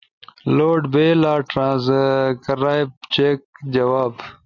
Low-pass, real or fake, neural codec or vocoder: 7.2 kHz; real; none